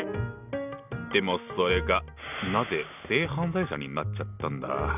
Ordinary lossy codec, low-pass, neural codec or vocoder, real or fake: none; 3.6 kHz; none; real